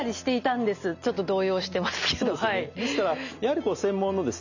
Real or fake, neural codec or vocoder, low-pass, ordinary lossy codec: real; none; 7.2 kHz; none